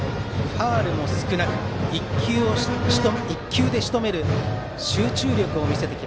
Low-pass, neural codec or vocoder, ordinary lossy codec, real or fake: none; none; none; real